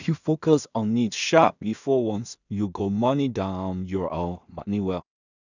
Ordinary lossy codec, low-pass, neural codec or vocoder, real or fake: none; 7.2 kHz; codec, 16 kHz in and 24 kHz out, 0.4 kbps, LongCat-Audio-Codec, two codebook decoder; fake